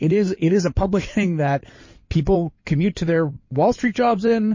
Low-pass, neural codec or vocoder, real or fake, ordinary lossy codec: 7.2 kHz; codec, 16 kHz in and 24 kHz out, 2.2 kbps, FireRedTTS-2 codec; fake; MP3, 32 kbps